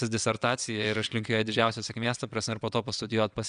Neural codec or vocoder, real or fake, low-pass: vocoder, 22.05 kHz, 80 mel bands, WaveNeXt; fake; 9.9 kHz